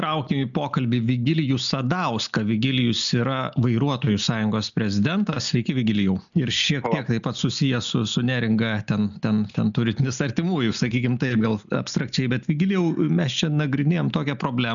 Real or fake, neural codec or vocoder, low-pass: real; none; 7.2 kHz